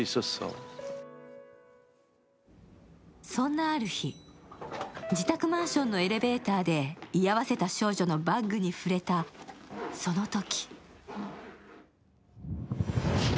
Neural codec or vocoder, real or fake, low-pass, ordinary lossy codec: none; real; none; none